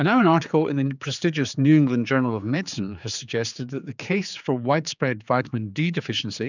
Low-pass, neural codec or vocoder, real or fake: 7.2 kHz; codec, 16 kHz, 4 kbps, X-Codec, HuBERT features, trained on general audio; fake